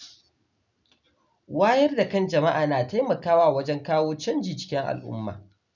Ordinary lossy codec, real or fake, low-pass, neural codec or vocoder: none; real; 7.2 kHz; none